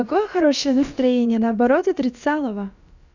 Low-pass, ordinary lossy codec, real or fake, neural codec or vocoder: 7.2 kHz; none; fake; codec, 16 kHz, about 1 kbps, DyCAST, with the encoder's durations